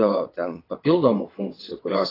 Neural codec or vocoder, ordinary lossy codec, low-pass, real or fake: vocoder, 22.05 kHz, 80 mel bands, WaveNeXt; AAC, 24 kbps; 5.4 kHz; fake